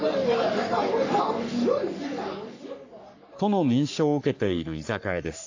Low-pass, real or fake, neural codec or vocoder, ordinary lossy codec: 7.2 kHz; fake; codec, 44.1 kHz, 3.4 kbps, Pupu-Codec; AAC, 48 kbps